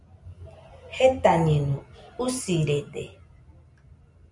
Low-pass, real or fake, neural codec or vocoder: 10.8 kHz; real; none